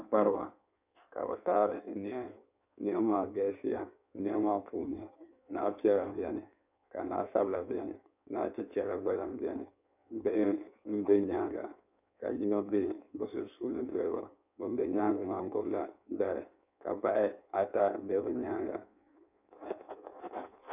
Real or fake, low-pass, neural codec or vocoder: fake; 3.6 kHz; codec, 16 kHz in and 24 kHz out, 1.1 kbps, FireRedTTS-2 codec